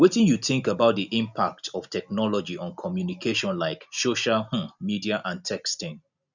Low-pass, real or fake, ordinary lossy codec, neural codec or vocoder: 7.2 kHz; real; none; none